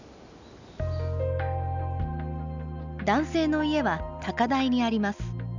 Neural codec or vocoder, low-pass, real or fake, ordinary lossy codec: none; 7.2 kHz; real; none